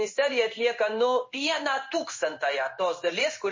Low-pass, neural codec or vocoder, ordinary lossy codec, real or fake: 7.2 kHz; codec, 16 kHz in and 24 kHz out, 1 kbps, XY-Tokenizer; MP3, 32 kbps; fake